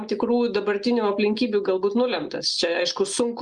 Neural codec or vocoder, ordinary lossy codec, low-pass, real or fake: none; Opus, 32 kbps; 10.8 kHz; real